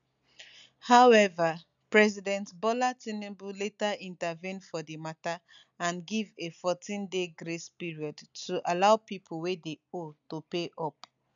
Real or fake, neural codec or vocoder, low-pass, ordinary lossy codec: real; none; 7.2 kHz; none